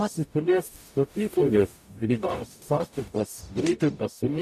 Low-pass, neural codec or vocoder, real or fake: 14.4 kHz; codec, 44.1 kHz, 0.9 kbps, DAC; fake